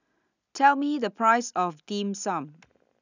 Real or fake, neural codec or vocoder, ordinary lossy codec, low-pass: real; none; none; 7.2 kHz